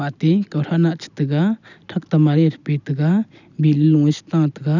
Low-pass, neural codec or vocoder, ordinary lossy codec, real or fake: 7.2 kHz; none; none; real